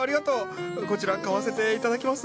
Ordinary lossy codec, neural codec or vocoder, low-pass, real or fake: none; none; none; real